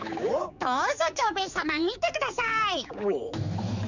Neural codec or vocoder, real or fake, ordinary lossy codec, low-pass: codec, 16 kHz, 4 kbps, X-Codec, HuBERT features, trained on general audio; fake; none; 7.2 kHz